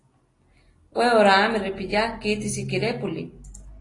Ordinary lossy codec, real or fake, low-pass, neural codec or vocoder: AAC, 32 kbps; real; 10.8 kHz; none